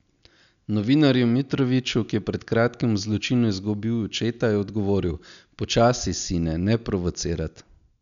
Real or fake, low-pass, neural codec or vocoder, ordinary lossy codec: real; 7.2 kHz; none; none